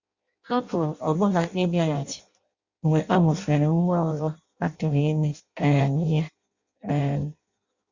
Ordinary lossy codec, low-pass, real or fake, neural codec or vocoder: Opus, 64 kbps; 7.2 kHz; fake; codec, 16 kHz in and 24 kHz out, 0.6 kbps, FireRedTTS-2 codec